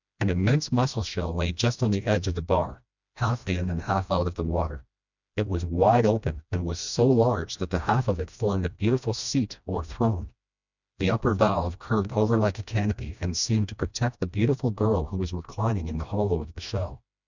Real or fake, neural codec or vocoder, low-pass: fake; codec, 16 kHz, 1 kbps, FreqCodec, smaller model; 7.2 kHz